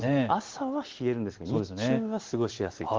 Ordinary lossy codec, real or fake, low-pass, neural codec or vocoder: Opus, 16 kbps; real; 7.2 kHz; none